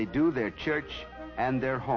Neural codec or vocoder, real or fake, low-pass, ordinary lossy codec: none; real; 7.2 kHz; MP3, 32 kbps